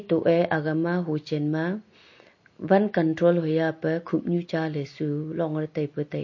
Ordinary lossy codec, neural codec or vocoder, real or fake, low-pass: MP3, 32 kbps; none; real; 7.2 kHz